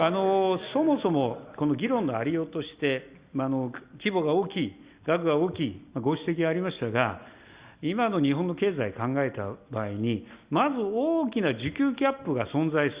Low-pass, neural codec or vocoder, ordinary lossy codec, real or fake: 3.6 kHz; none; Opus, 64 kbps; real